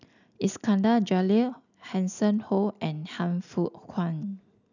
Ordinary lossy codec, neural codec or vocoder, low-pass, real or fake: none; none; 7.2 kHz; real